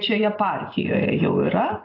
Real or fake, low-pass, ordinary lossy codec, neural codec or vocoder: real; 5.4 kHz; AAC, 24 kbps; none